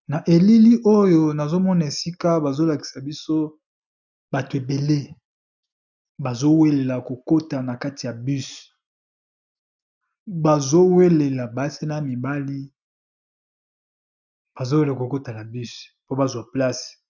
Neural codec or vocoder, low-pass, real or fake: none; 7.2 kHz; real